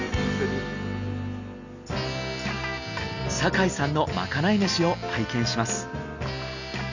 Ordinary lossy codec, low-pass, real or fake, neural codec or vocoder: none; 7.2 kHz; real; none